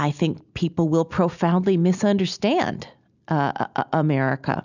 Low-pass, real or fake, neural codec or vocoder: 7.2 kHz; real; none